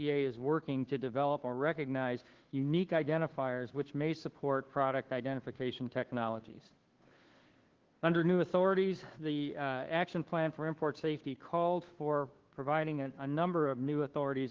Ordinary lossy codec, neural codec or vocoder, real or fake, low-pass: Opus, 16 kbps; codec, 16 kHz, 2 kbps, FunCodec, trained on Chinese and English, 25 frames a second; fake; 7.2 kHz